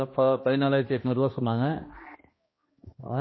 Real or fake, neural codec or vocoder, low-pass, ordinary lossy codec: fake; codec, 16 kHz, 1 kbps, X-Codec, HuBERT features, trained on balanced general audio; 7.2 kHz; MP3, 24 kbps